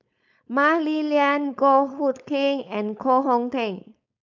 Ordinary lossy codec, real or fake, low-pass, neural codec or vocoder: none; fake; 7.2 kHz; codec, 16 kHz, 4.8 kbps, FACodec